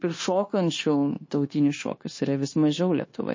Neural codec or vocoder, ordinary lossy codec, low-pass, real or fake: codec, 16 kHz, 0.9 kbps, LongCat-Audio-Codec; MP3, 32 kbps; 7.2 kHz; fake